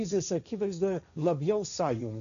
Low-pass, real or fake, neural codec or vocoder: 7.2 kHz; fake; codec, 16 kHz, 1.1 kbps, Voila-Tokenizer